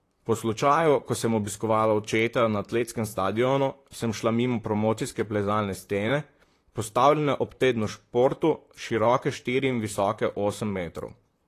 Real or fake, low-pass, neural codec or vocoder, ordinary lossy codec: fake; 14.4 kHz; vocoder, 44.1 kHz, 128 mel bands, Pupu-Vocoder; AAC, 48 kbps